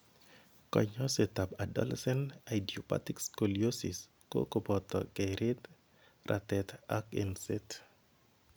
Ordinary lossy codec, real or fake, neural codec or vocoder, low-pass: none; real; none; none